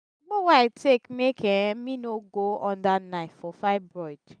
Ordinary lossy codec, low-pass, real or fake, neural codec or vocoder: none; 9.9 kHz; real; none